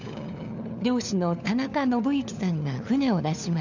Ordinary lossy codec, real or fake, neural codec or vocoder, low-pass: none; fake; codec, 16 kHz, 4 kbps, FunCodec, trained on LibriTTS, 50 frames a second; 7.2 kHz